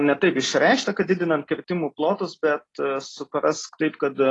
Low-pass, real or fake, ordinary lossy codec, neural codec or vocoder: 10.8 kHz; real; AAC, 32 kbps; none